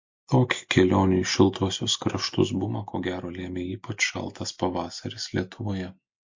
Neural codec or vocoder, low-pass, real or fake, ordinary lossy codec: none; 7.2 kHz; real; MP3, 48 kbps